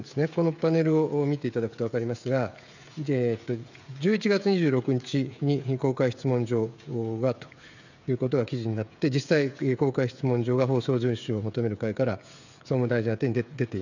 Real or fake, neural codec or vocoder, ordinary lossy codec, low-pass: fake; codec, 16 kHz, 16 kbps, FreqCodec, smaller model; none; 7.2 kHz